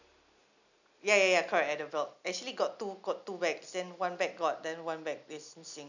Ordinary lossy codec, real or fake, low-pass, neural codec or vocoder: none; real; 7.2 kHz; none